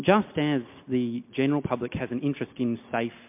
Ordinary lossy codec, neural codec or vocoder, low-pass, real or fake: MP3, 32 kbps; none; 3.6 kHz; real